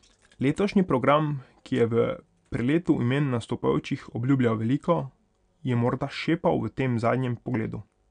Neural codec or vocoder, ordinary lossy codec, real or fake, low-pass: none; none; real; 9.9 kHz